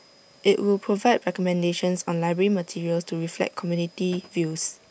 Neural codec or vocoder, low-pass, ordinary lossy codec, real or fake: none; none; none; real